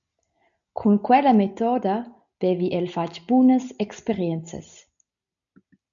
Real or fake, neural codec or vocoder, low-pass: real; none; 7.2 kHz